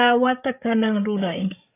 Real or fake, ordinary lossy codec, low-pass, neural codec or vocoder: fake; AAC, 16 kbps; 3.6 kHz; codec, 16 kHz, 8 kbps, FreqCodec, larger model